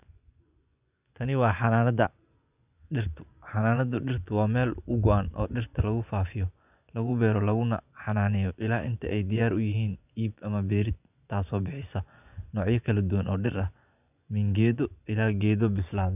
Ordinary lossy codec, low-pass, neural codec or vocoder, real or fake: none; 3.6 kHz; vocoder, 24 kHz, 100 mel bands, Vocos; fake